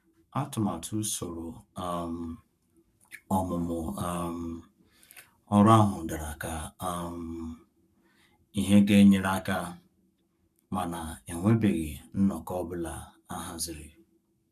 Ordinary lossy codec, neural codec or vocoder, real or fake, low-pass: none; codec, 44.1 kHz, 7.8 kbps, Pupu-Codec; fake; 14.4 kHz